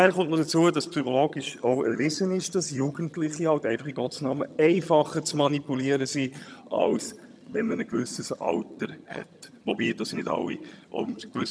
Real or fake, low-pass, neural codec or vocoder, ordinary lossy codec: fake; none; vocoder, 22.05 kHz, 80 mel bands, HiFi-GAN; none